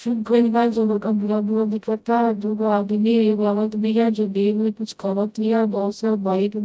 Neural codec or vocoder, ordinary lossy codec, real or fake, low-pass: codec, 16 kHz, 0.5 kbps, FreqCodec, smaller model; none; fake; none